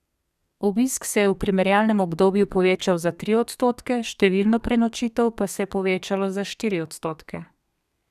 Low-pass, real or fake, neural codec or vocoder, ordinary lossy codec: 14.4 kHz; fake; codec, 32 kHz, 1.9 kbps, SNAC; none